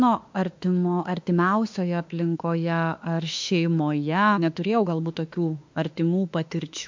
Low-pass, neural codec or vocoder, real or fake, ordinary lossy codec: 7.2 kHz; autoencoder, 48 kHz, 32 numbers a frame, DAC-VAE, trained on Japanese speech; fake; MP3, 48 kbps